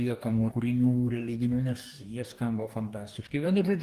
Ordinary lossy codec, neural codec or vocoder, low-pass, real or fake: Opus, 24 kbps; codec, 44.1 kHz, 2.6 kbps, DAC; 14.4 kHz; fake